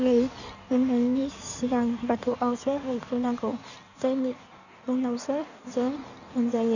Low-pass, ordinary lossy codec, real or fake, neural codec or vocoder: 7.2 kHz; none; fake; codec, 16 kHz in and 24 kHz out, 1.1 kbps, FireRedTTS-2 codec